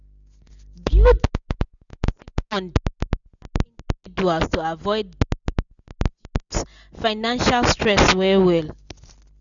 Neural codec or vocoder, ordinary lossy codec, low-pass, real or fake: none; none; 7.2 kHz; real